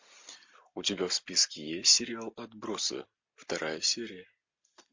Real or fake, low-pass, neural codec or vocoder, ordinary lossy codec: real; 7.2 kHz; none; MP3, 48 kbps